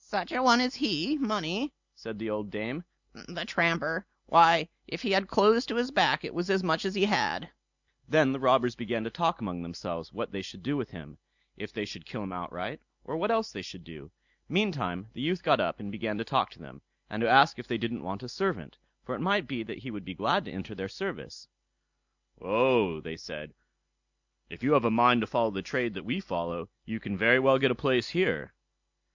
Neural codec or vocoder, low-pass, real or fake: none; 7.2 kHz; real